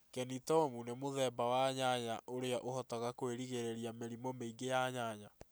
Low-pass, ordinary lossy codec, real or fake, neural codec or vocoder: none; none; real; none